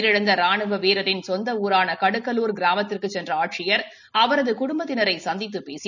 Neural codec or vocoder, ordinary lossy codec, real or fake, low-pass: none; none; real; 7.2 kHz